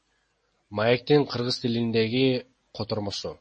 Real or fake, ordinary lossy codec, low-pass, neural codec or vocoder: real; MP3, 32 kbps; 10.8 kHz; none